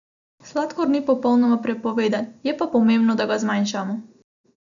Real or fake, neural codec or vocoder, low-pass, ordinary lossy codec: real; none; 7.2 kHz; none